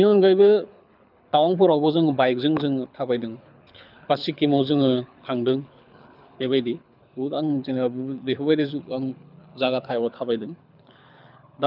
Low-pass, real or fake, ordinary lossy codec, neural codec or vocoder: 5.4 kHz; fake; none; codec, 24 kHz, 6 kbps, HILCodec